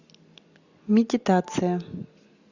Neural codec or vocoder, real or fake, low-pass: none; real; 7.2 kHz